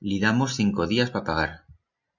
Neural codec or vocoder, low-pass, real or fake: none; 7.2 kHz; real